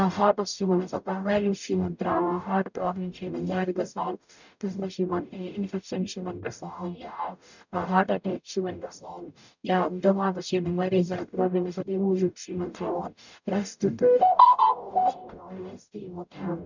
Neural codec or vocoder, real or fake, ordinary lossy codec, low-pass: codec, 44.1 kHz, 0.9 kbps, DAC; fake; none; 7.2 kHz